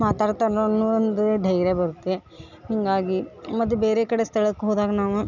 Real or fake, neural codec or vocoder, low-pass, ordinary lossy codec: real; none; 7.2 kHz; none